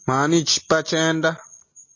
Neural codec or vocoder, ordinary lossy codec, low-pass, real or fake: none; MP3, 32 kbps; 7.2 kHz; real